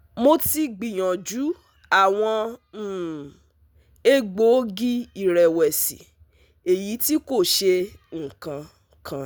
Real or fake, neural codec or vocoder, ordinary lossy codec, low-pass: real; none; none; none